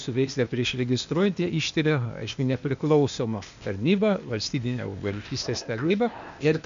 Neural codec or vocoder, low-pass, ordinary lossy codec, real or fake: codec, 16 kHz, 0.8 kbps, ZipCodec; 7.2 kHz; MP3, 64 kbps; fake